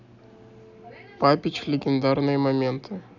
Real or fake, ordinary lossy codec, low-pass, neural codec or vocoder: real; none; 7.2 kHz; none